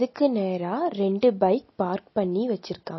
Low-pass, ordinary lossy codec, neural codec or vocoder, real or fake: 7.2 kHz; MP3, 24 kbps; none; real